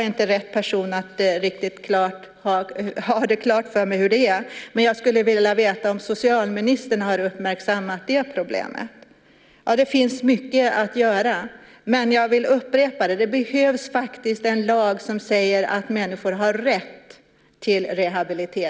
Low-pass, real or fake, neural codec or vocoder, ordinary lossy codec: none; real; none; none